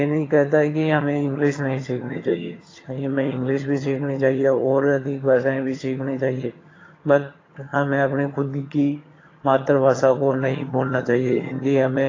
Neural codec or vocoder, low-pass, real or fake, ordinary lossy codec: vocoder, 22.05 kHz, 80 mel bands, HiFi-GAN; 7.2 kHz; fake; AAC, 32 kbps